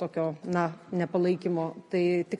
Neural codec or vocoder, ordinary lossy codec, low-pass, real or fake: none; MP3, 48 kbps; 19.8 kHz; real